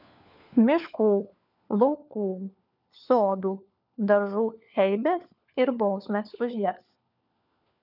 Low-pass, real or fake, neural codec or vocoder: 5.4 kHz; fake; codec, 16 kHz, 4 kbps, FunCodec, trained on LibriTTS, 50 frames a second